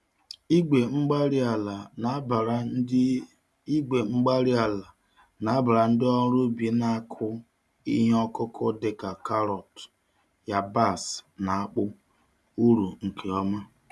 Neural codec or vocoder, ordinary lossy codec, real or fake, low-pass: none; none; real; none